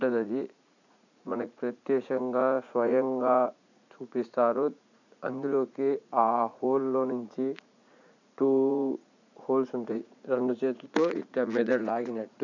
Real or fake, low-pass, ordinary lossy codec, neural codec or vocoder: fake; 7.2 kHz; none; vocoder, 44.1 kHz, 80 mel bands, Vocos